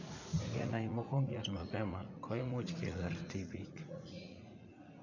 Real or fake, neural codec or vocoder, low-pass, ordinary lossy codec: fake; vocoder, 44.1 kHz, 80 mel bands, Vocos; 7.2 kHz; none